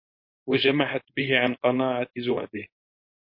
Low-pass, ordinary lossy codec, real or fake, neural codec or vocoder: 5.4 kHz; MP3, 32 kbps; fake; codec, 16 kHz, 4.8 kbps, FACodec